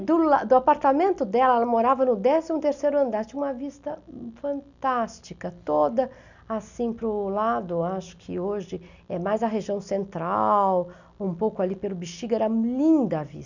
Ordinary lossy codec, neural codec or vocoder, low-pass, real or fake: none; none; 7.2 kHz; real